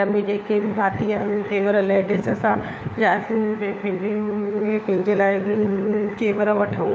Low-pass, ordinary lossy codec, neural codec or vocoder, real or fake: none; none; codec, 16 kHz, 4 kbps, FunCodec, trained on LibriTTS, 50 frames a second; fake